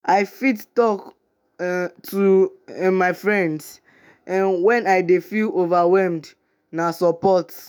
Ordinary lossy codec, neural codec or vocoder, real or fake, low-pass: none; autoencoder, 48 kHz, 128 numbers a frame, DAC-VAE, trained on Japanese speech; fake; none